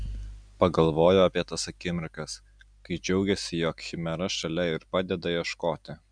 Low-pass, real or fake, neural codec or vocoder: 9.9 kHz; real; none